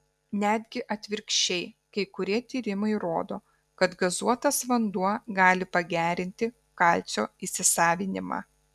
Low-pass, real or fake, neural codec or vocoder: 14.4 kHz; real; none